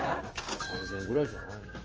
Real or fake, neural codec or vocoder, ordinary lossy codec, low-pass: fake; codec, 16 kHz, 6 kbps, DAC; Opus, 24 kbps; 7.2 kHz